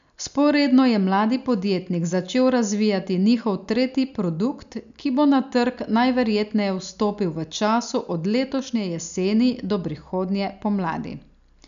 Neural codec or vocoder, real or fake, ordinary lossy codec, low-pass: none; real; none; 7.2 kHz